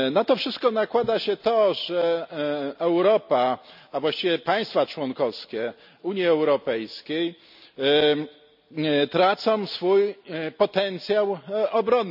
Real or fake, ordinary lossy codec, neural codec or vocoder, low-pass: real; none; none; 5.4 kHz